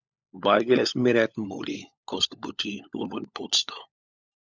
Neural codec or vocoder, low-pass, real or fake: codec, 16 kHz, 16 kbps, FunCodec, trained on LibriTTS, 50 frames a second; 7.2 kHz; fake